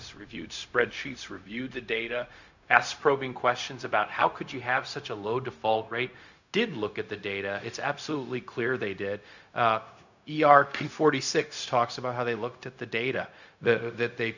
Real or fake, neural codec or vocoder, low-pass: fake; codec, 16 kHz, 0.4 kbps, LongCat-Audio-Codec; 7.2 kHz